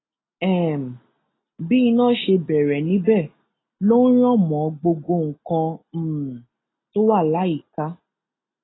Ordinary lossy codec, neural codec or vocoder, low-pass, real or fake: AAC, 16 kbps; none; 7.2 kHz; real